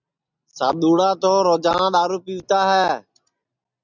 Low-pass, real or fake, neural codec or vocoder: 7.2 kHz; real; none